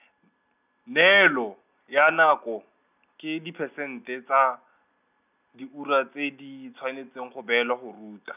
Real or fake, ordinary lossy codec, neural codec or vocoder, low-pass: real; none; none; 3.6 kHz